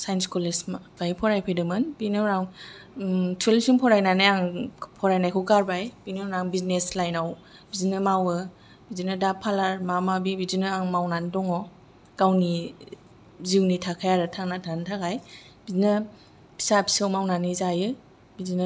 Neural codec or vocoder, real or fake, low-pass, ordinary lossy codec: none; real; none; none